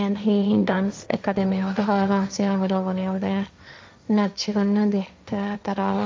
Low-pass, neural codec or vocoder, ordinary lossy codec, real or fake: none; codec, 16 kHz, 1.1 kbps, Voila-Tokenizer; none; fake